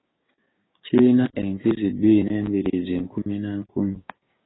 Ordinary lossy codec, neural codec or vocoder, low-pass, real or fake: AAC, 16 kbps; codec, 16 kHz, 6 kbps, DAC; 7.2 kHz; fake